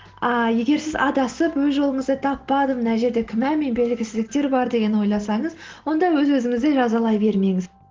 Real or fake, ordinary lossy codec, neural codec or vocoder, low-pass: real; Opus, 16 kbps; none; 7.2 kHz